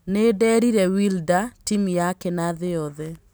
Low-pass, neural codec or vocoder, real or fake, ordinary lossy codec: none; none; real; none